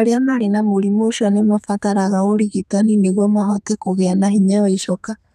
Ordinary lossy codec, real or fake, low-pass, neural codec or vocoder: none; fake; 14.4 kHz; codec, 32 kHz, 1.9 kbps, SNAC